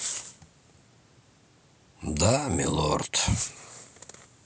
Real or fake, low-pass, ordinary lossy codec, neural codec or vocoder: real; none; none; none